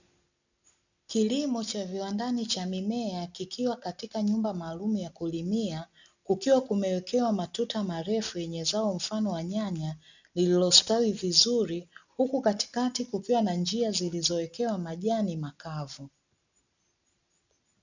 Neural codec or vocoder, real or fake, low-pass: none; real; 7.2 kHz